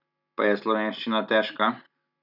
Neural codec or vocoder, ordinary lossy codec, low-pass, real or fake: none; none; 5.4 kHz; real